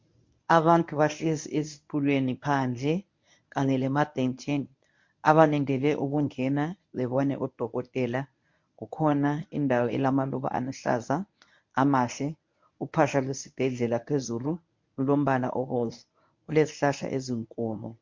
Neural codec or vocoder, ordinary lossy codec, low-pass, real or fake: codec, 24 kHz, 0.9 kbps, WavTokenizer, medium speech release version 1; MP3, 48 kbps; 7.2 kHz; fake